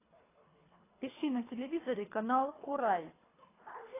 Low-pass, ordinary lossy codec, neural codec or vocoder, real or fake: 3.6 kHz; AAC, 16 kbps; codec, 24 kHz, 3 kbps, HILCodec; fake